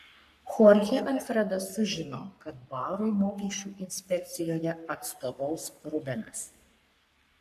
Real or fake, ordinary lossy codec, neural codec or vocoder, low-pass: fake; MP3, 96 kbps; codec, 44.1 kHz, 3.4 kbps, Pupu-Codec; 14.4 kHz